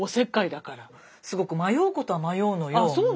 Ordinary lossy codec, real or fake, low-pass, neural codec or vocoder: none; real; none; none